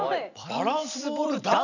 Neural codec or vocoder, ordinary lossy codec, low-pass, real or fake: vocoder, 44.1 kHz, 128 mel bands every 256 samples, BigVGAN v2; none; 7.2 kHz; fake